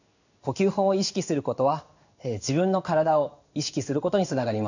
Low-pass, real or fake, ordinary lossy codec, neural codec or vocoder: 7.2 kHz; fake; none; codec, 16 kHz in and 24 kHz out, 1 kbps, XY-Tokenizer